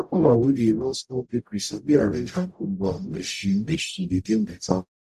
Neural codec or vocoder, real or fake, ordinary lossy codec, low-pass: codec, 44.1 kHz, 0.9 kbps, DAC; fake; none; 14.4 kHz